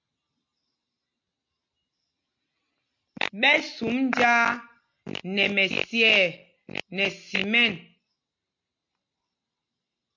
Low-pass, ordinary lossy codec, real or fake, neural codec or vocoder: 7.2 kHz; MP3, 48 kbps; real; none